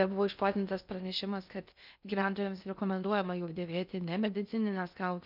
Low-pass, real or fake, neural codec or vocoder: 5.4 kHz; fake; codec, 16 kHz in and 24 kHz out, 0.6 kbps, FocalCodec, streaming, 2048 codes